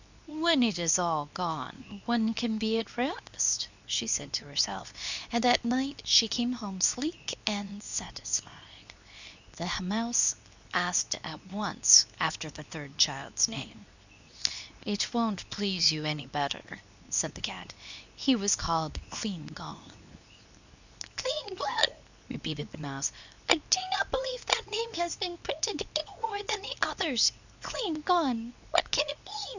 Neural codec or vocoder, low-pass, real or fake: codec, 24 kHz, 0.9 kbps, WavTokenizer, medium speech release version 2; 7.2 kHz; fake